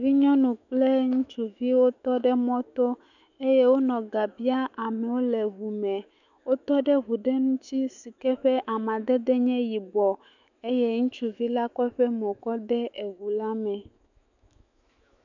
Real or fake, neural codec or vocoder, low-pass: fake; autoencoder, 48 kHz, 128 numbers a frame, DAC-VAE, trained on Japanese speech; 7.2 kHz